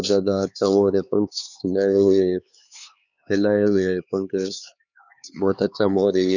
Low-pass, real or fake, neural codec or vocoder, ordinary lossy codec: 7.2 kHz; fake; codec, 16 kHz, 4 kbps, X-Codec, HuBERT features, trained on LibriSpeech; none